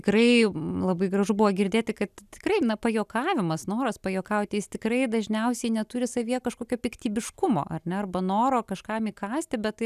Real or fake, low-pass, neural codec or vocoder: real; 14.4 kHz; none